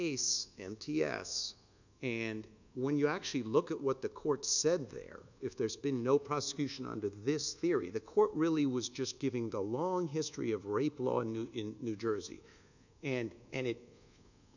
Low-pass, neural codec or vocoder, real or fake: 7.2 kHz; codec, 24 kHz, 1.2 kbps, DualCodec; fake